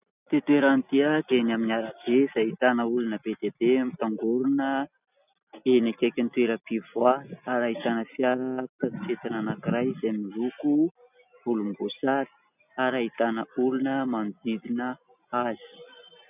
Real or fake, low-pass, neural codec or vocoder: real; 3.6 kHz; none